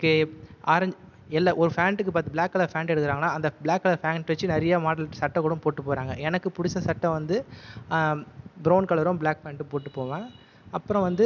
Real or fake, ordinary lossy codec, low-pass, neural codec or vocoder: real; none; 7.2 kHz; none